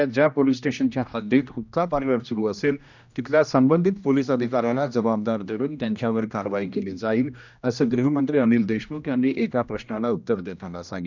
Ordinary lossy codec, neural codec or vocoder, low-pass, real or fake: none; codec, 16 kHz, 1 kbps, X-Codec, HuBERT features, trained on general audio; 7.2 kHz; fake